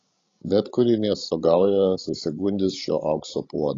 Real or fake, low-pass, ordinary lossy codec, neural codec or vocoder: fake; 7.2 kHz; AAC, 32 kbps; codec, 16 kHz, 16 kbps, FreqCodec, larger model